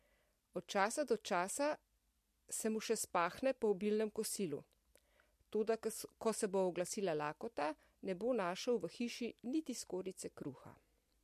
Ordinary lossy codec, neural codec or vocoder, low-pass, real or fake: MP3, 64 kbps; none; 14.4 kHz; real